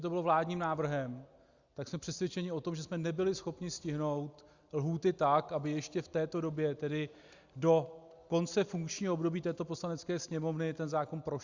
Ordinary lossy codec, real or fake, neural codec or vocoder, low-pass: Opus, 64 kbps; real; none; 7.2 kHz